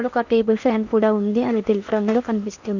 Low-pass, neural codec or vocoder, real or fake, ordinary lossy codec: 7.2 kHz; codec, 16 kHz in and 24 kHz out, 0.8 kbps, FocalCodec, streaming, 65536 codes; fake; none